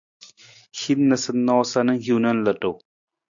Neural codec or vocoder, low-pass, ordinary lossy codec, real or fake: none; 7.2 kHz; MP3, 64 kbps; real